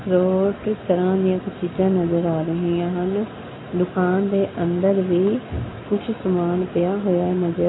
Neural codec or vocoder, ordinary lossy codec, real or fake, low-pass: none; AAC, 16 kbps; real; 7.2 kHz